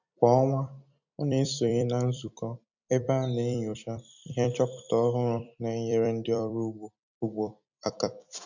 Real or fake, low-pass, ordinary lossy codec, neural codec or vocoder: fake; 7.2 kHz; none; vocoder, 44.1 kHz, 128 mel bands every 256 samples, BigVGAN v2